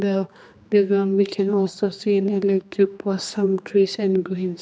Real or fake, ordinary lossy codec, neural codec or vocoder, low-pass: fake; none; codec, 16 kHz, 2 kbps, X-Codec, HuBERT features, trained on general audio; none